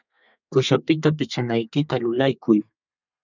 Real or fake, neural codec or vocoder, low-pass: fake; codec, 32 kHz, 1.9 kbps, SNAC; 7.2 kHz